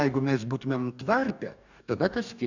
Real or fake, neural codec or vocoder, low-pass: fake; codec, 44.1 kHz, 2.6 kbps, DAC; 7.2 kHz